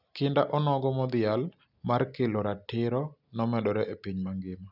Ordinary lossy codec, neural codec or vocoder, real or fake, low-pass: none; none; real; 5.4 kHz